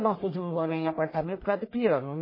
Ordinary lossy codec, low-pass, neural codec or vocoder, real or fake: MP3, 24 kbps; 5.4 kHz; codec, 44.1 kHz, 1.7 kbps, Pupu-Codec; fake